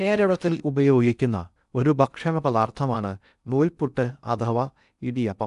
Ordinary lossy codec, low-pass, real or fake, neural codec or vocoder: none; 10.8 kHz; fake; codec, 16 kHz in and 24 kHz out, 0.6 kbps, FocalCodec, streaming, 2048 codes